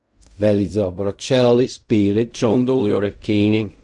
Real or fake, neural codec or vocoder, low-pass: fake; codec, 16 kHz in and 24 kHz out, 0.4 kbps, LongCat-Audio-Codec, fine tuned four codebook decoder; 10.8 kHz